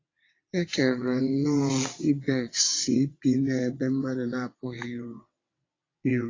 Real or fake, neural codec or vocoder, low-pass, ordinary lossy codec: fake; vocoder, 22.05 kHz, 80 mel bands, WaveNeXt; 7.2 kHz; AAC, 32 kbps